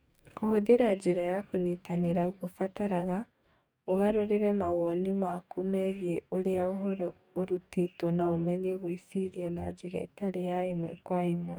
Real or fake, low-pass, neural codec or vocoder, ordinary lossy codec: fake; none; codec, 44.1 kHz, 2.6 kbps, DAC; none